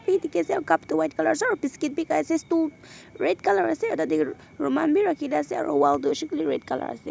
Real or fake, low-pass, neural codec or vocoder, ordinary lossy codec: real; none; none; none